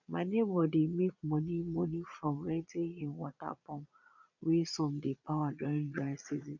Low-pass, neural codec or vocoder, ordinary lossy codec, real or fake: 7.2 kHz; vocoder, 22.05 kHz, 80 mel bands, Vocos; none; fake